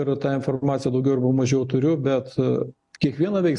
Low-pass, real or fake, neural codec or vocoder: 10.8 kHz; real; none